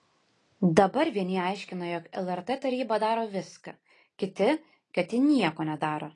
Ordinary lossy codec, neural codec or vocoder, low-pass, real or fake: AAC, 32 kbps; none; 10.8 kHz; real